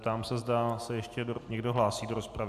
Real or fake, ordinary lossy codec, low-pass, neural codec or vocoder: real; AAC, 96 kbps; 14.4 kHz; none